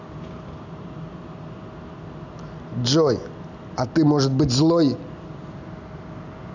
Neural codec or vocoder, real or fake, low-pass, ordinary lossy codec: none; real; 7.2 kHz; none